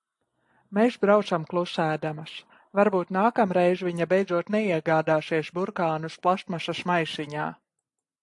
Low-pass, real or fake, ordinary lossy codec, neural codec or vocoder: 10.8 kHz; real; AAC, 64 kbps; none